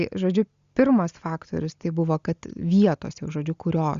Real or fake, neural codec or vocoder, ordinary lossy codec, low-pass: real; none; Opus, 64 kbps; 7.2 kHz